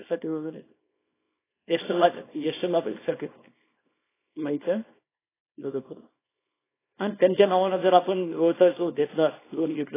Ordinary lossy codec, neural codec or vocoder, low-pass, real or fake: AAC, 16 kbps; codec, 24 kHz, 0.9 kbps, WavTokenizer, small release; 3.6 kHz; fake